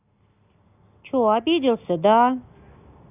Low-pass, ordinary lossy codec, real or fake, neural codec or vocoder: 3.6 kHz; none; real; none